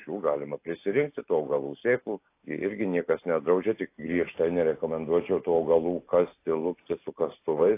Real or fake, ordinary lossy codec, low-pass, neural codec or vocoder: real; AAC, 24 kbps; 3.6 kHz; none